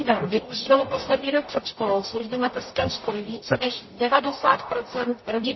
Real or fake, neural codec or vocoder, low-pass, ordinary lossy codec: fake; codec, 44.1 kHz, 0.9 kbps, DAC; 7.2 kHz; MP3, 24 kbps